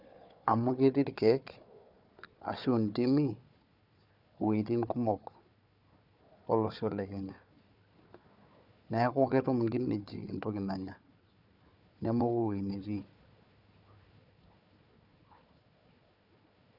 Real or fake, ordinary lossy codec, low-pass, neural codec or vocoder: fake; none; 5.4 kHz; codec, 16 kHz, 4 kbps, FunCodec, trained on Chinese and English, 50 frames a second